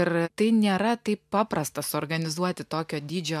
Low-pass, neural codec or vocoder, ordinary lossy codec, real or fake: 14.4 kHz; none; MP3, 96 kbps; real